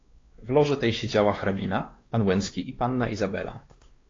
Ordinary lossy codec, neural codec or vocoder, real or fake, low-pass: AAC, 32 kbps; codec, 16 kHz, 2 kbps, X-Codec, WavLM features, trained on Multilingual LibriSpeech; fake; 7.2 kHz